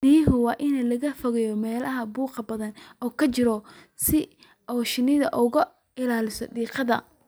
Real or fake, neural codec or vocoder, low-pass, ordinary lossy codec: real; none; none; none